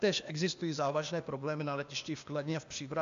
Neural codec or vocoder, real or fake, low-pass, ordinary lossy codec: codec, 16 kHz, 0.8 kbps, ZipCodec; fake; 7.2 kHz; AAC, 64 kbps